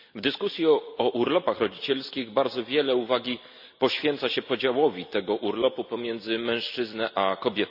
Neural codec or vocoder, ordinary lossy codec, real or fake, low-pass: none; none; real; 5.4 kHz